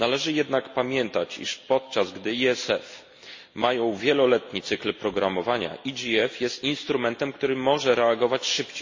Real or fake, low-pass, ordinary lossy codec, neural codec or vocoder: real; 7.2 kHz; MP3, 32 kbps; none